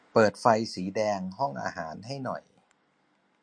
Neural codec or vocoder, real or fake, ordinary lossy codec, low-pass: none; real; MP3, 48 kbps; 9.9 kHz